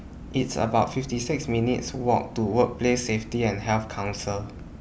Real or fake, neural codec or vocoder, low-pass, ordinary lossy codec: real; none; none; none